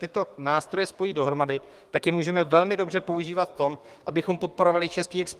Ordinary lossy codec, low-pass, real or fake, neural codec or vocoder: Opus, 32 kbps; 14.4 kHz; fake; codec, 32 kHz, 1.9 kbps, SNAC